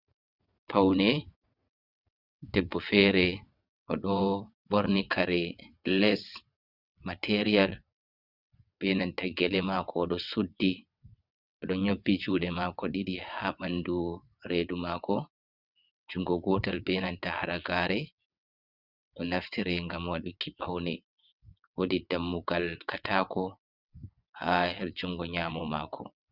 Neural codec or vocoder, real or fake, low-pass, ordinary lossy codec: vocoder, 22.05 kHz, 80 mel bands, WaveNeXt; fake; 5.4 kHz; Opus, 64 kbps